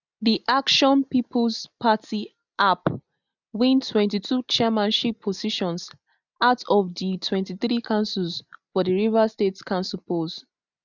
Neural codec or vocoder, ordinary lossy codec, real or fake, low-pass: none; none; real; 7.2 kHz